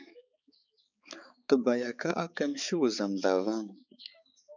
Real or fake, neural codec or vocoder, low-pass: fake; codec, 16 kHz, 4 kbps, X-Codec, HuBERT features, trained on balanced general audio; 7.2 kHz